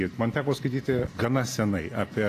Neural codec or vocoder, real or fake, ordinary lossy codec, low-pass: none; real; AAC, 48 kbps; 14.4 kHz